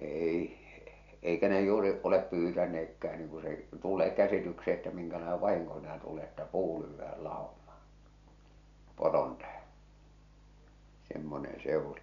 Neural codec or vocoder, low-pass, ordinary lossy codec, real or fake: none; 7.2 kHz; none; real